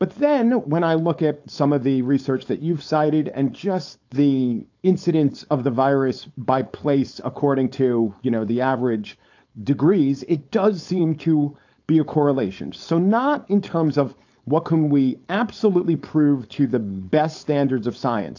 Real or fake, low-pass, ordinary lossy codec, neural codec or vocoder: fake; 7.2 kHz; AAC, 48 kbps; codec, 16 kHz, 4.8 kbps, FACodec